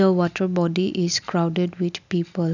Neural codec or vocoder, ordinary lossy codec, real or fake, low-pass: none; none; real; 7.2 kHz